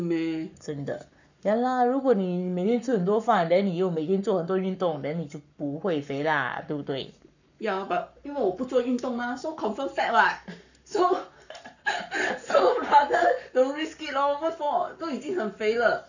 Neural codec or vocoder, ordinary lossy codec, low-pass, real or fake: codec, 44.1 kHz, 7.8 kbps, Pupu-Codec; none; 7.2 kHz; fake